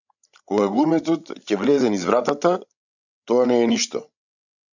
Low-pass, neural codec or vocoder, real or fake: 7.2 kHz; codec, 16 kHz, 16 kbps, FreqCodec, larger model; fake